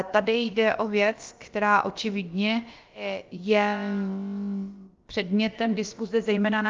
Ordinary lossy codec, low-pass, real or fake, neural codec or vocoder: Opus, 32 kbps; 7.2 kHz; fake; codec, 16 kHz, about 1 kbps, DyCAST, with the encoder's durations